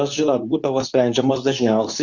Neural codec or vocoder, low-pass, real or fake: codec, 24 kHz, 0.9 kbps, WavTokenizer, medium speech release version 2; 7.2 kHz; fake